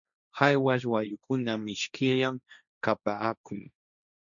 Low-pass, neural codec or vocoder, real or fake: 7.2 kHz; codec, 16 kHz, 1.1 kbps, Voila-Tokenizer; fake